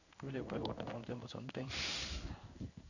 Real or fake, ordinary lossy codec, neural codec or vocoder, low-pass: fake; none; codec, 16 kHz in and 24 kHz out, 1 kbps, XY-Tokenizer; 7.2 kHz